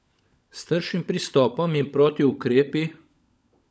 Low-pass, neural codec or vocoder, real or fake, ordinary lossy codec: none; codec, 16 kHz, 16 kbps, FunCodec, trained on LibriTTS, 50 frames a second; fake; none